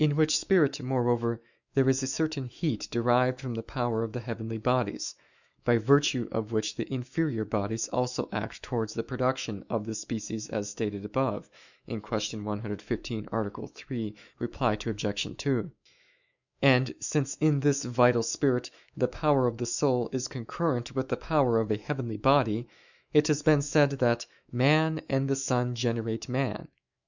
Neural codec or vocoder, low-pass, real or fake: autoencoder, 48 kHz, 128 numbers a frame, DAC-VAE, trained on Japanese speech; 7.2 kHz; fake